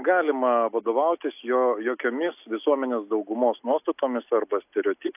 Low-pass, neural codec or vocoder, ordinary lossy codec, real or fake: 3.6 kHz; none; AAC, 32 kbps; real